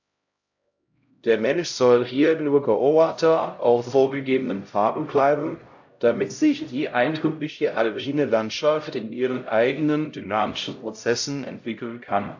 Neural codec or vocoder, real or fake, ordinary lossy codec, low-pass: codec, 16 kHz, 0.5 kbps, X-Codec, HuBERT features, trained on LibriSpeech; fake; AAC, 48 kbps; 7.2 kHz